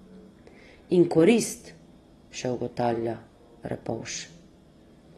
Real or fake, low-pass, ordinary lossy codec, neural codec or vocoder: real; 19.8 kHz; AAC, 32 kbps; none